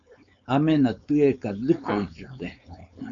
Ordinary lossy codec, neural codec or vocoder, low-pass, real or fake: AAC, 64 kbps; codec, 16 kHz, 4.8 kbps, FACodec; 7.2 kHz; fake